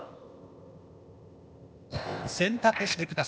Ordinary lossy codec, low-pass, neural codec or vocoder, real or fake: none; none; codec, 16 kHz, 0.8 kbps, ZipCodec; fake